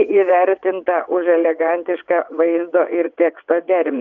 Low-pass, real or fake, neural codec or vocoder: 7.2 kHz; fake; vocoder, 22.05 kHz, 80 mel bands, WaveNeXt